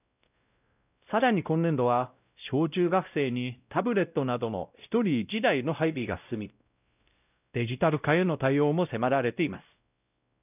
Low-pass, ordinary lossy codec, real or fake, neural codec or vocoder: 3.6 kHz; none; fake; codec, 16 kHz, 0.5 kbps, X-Codec, WavLM features, trained on Multilingual LibriSpeech